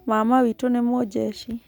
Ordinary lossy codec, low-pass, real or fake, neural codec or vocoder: none; none; real; none